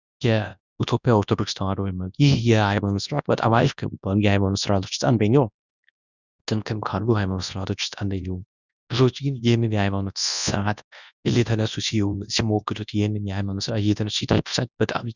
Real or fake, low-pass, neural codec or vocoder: fake; 7.2 kHz; codec, 24 kHz, 0.9 kbps, WavTokenizer, large speech release